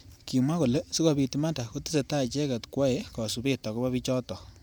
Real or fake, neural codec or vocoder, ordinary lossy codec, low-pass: fake; vocoder, 44.1 kHz, 128 mel bands every 512 samples, BigVGAN v2; none; none